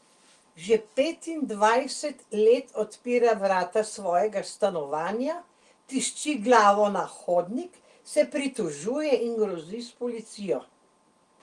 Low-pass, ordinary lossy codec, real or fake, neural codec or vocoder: 10.8 kHz; Opus, 24 kbps; real; none